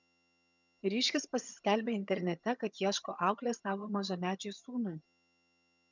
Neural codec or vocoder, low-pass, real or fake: vocoder, 22.05 kHz, 80 mel bands, HiFi-GAN; 7.2 kHz; fake